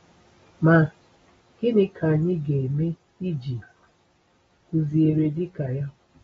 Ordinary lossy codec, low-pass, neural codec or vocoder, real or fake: AAC, 24 kbps; 10.8 kHz; none; real